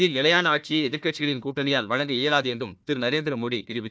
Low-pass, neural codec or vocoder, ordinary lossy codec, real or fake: none; codec, 16 kHz, 1 kbps, FunCodec, trained on Chinese and English, 50 frames a second; none; fake